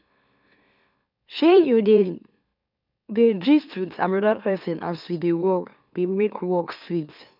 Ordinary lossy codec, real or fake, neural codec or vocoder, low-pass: none; fake; autoencoder, 44.1 kHz, a latent of 192 numbers a frame, MeloTTS; 5.4 kHz